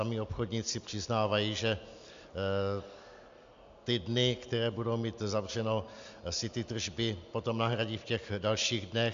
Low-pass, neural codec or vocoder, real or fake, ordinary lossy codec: 7.2 kHz; none; real; MP3, 64 kbps